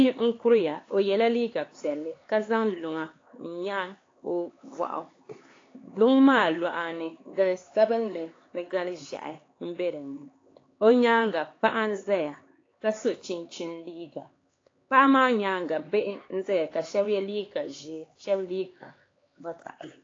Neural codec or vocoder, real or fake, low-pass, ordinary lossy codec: codec, 16 kHz, 4 kbps, X-Codec, HuBERT features, trained on LibriSpeech; fake; 7.2 kHz; AAC, 32 kbps